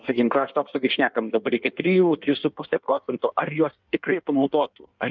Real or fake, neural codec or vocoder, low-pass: fake; codec, 16 kHz in and 24 kHz out, 1.1 kbps, FireRedTTS-2 codec; 7.2 kHz